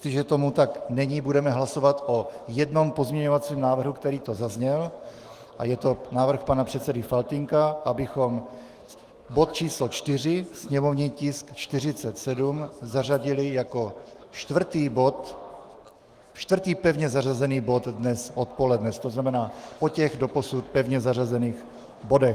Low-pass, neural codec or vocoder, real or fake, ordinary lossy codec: 14.4 kHz; autoencoder, 48 kHz, 128 numbers a frame, DAC-VAE, trained on Japanese speech; fake; Opus, 24 kbps